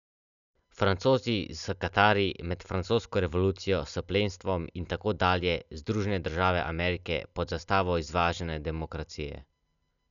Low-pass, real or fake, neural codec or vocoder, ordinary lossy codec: 7.2 kHz; real; none; none